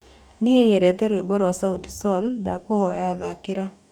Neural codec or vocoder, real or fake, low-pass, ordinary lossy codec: codec, 44.1 kHz, 2.6 kbps, DAC; fake; 19.8 kHz; none